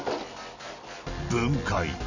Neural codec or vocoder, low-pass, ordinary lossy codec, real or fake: none; 7.2 kHz; none; real